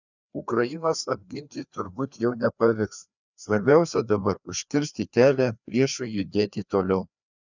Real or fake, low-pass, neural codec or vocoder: fake; 7.2 kHz; codec, 16 kHz, 2 kbps, FreqCodec, larger model